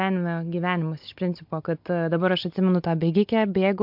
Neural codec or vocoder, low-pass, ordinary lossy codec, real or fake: none; 5.4 kHz; MP3, 48 kbps; real